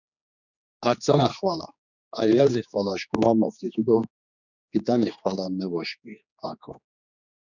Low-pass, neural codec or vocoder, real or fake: 7.2 kHz; codec, 16 kHz, 2 kbps, X-Codec, HuBERT features, trained on general audio; fake